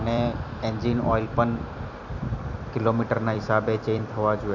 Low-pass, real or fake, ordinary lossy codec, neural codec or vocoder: 7.2 kHz; fake; none; vocoder, 44.1 kHz, 128 mel bands every 256 samples, BigVGAN v2